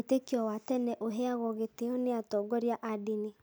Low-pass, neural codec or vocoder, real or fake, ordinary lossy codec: none; none; real; none